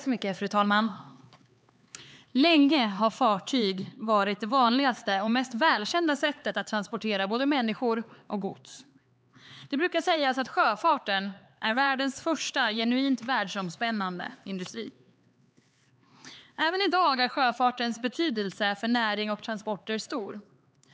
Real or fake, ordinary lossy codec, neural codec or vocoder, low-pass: fake; none; codec, 16 kHz, 4 kbps, X-Codec, HuBERT features, trained on LibriSpeech; none